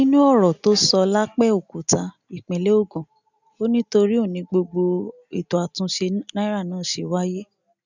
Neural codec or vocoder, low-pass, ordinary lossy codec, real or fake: none; 7.2 kHz; none; real